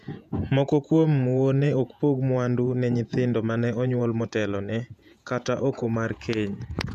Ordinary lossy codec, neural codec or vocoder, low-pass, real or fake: none; vocoder, 48 kHz, 128 mel bands, Vocos; 14.4 kHz; fake